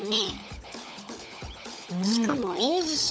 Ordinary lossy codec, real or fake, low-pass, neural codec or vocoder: none; fake; none; codec, 16 kHz, 16 kbps, FunCodec, trained on LibriTTS, 50 frames a second